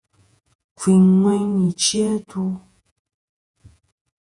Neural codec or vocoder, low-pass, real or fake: vocoder, 48 kHz, 128 mel bands, Vocos; 10.8 kHz; fake